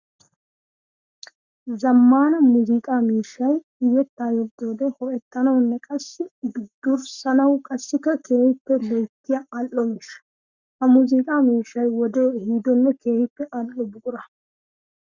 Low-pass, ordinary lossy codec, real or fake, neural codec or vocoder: 7.2 kHz; Opus, 64 kbps; fake; codec, 44.1 kHz, 7.8 kbps, Pupu-Codec